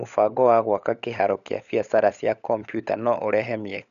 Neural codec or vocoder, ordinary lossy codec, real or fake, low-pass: codec, 16 kHz, 16 kbps, FunCodec, trained on LibriTTS, 50 frames a second; none; fake; 7.2 kHz